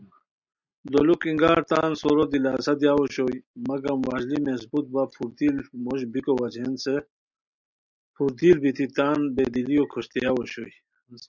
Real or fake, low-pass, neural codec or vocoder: real; 7.2 kHz; none